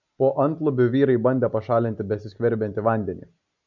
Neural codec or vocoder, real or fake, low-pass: none; real; 7.2 kHz